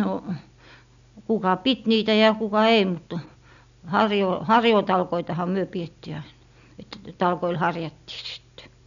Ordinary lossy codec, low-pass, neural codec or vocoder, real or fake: none; 7.2 kHz; none; real